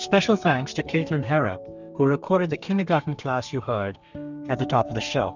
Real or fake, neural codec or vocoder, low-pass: fake; codec, 32 kHz, 1.9 kbps, SNAC; 7.2 kHz